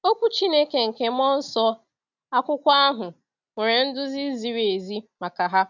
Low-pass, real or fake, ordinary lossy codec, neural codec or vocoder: 7.2 kHz; real; none; none